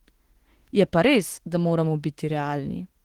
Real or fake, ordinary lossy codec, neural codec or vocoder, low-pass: fake; Opus, 16 kbps; autoencoder, 48 kHz, 32 numbers a frame, DAC-VAE, trained on Japanese speech; 19.8 kHz